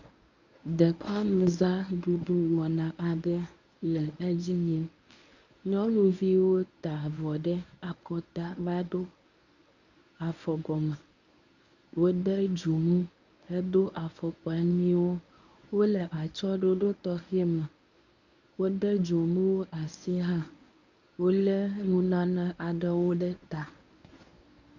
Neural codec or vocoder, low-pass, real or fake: codec, 24 kHz, 0.9 kbps, WavTokenizer, medium speech release version 1; 7.2 kHz; fake